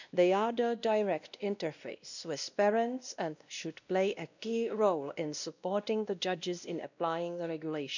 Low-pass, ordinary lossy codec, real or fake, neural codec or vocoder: 7.2 kHz; none; fake; codec, 16 kHz, 1 kbps, X-Codec, WavLM features, trained on Multilingual LibriSpeech